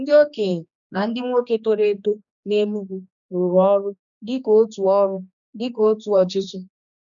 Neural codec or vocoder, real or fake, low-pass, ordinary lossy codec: codec, 16 kHz, 2 kbps, X-Codec, HuBERT features, trained on general audio; fake; 7.2 kHz; none